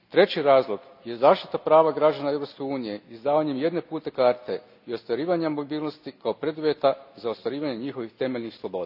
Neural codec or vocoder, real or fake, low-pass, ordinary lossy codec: none; real; 5.4 kHz; none